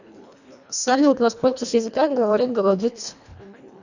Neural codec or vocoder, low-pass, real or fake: codec, 24 kHz, 1.5 kbps, HILCodec; 7.2 kHz; fake